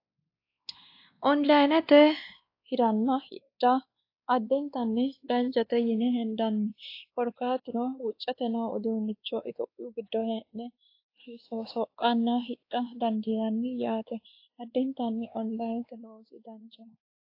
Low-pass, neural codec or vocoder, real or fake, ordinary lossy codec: 5.4 kHz; codec, 16 kHz, 2 kbps, X-Codec, WavLM features, trained on Multilingual LibriSpeech; fake; AAC, 32 kbps